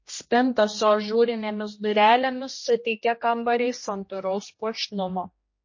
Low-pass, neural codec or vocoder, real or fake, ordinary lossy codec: 7.2 kHz; codec, 16 kHz, 1 kbps, X-Codec, HuBERT features, trained on general audio; fake; MP3, 32 kbps